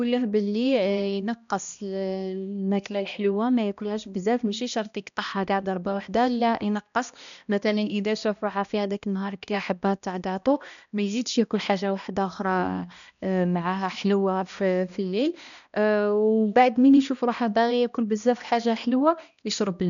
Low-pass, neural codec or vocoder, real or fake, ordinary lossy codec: 7.2 kHz; codec, 16 kHz, 1 kbps, X-Codec, HuBERT features, trained on balanced general audio; fake; none